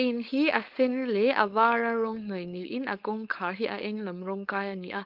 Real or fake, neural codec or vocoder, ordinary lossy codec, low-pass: fake; codec, 16 kHz, 4.8 kbps, FACodec; Opus, 32 kbps; 5.4 kHz